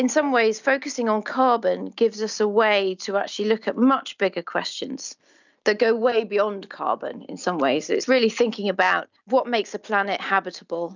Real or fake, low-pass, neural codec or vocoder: real; 7.2 kHz; none